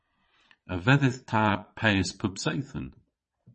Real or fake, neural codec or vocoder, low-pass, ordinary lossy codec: fake; vocoder, 22.05 kHz, 80 mel bands, Vocos; 9.9 kHz; MP3, 32 kbps